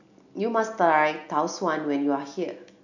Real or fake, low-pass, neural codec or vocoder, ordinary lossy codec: real; 7.2 kHz; none; none